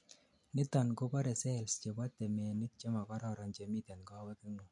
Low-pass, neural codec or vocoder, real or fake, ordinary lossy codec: 10.8 kHz; none; real; MP3, 64 kbps